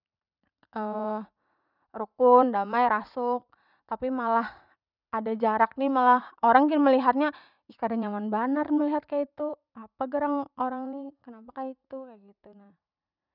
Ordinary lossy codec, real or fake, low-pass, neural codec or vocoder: none; fake; 5.4 kHz; vocoder, 44.1 kHz, 80 mel bands, Vocos